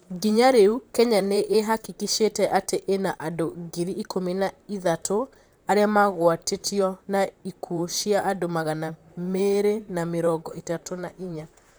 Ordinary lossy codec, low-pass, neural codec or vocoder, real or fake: none; none; vocoder, 44.1 kHz, 128 mel bands, Pupu-Vocoder; fake